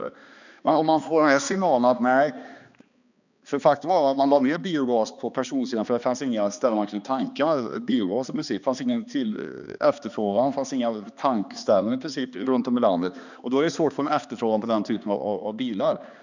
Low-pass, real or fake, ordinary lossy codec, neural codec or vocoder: 7.2 kHz; fake; none; codec, 16 kHz, 2 kbps, X-Codec, HuBERT features, trained on balanced general audio